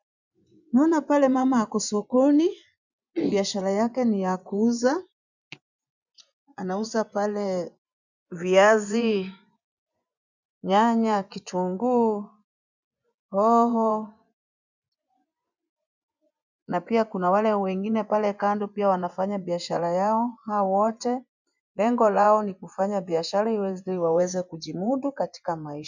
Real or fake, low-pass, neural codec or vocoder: fake; 7.2 kHz; vocoder, 24 kHz, 100 mel bands, Vocos